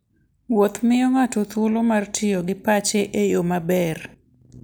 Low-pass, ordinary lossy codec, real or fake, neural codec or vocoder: none; none; real; none